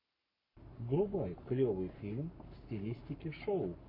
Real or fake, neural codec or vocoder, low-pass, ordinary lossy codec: fake; codec, 44.1 kHz, 7.8 kbps, Pupu-Codec; 5.4 kHz; MP3, 32 kbps